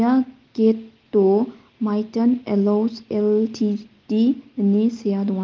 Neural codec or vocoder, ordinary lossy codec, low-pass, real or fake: none; Opus, 32 kbps; 7.2 kHz; real